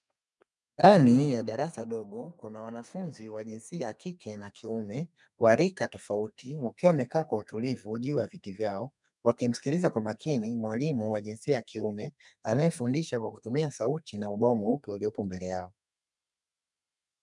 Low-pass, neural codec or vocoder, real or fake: 10.8 kHz; codec, 32 kHz, 1.9 kbps, SNAC; fake